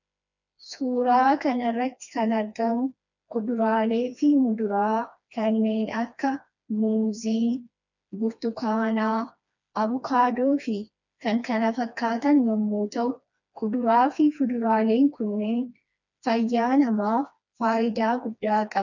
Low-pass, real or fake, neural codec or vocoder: 7.2 kHz; fake; codec, 16 kHz, 2 kbps, FreqCodec, smaller model